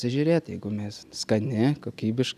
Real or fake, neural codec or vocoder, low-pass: real; none; 14.4 kHz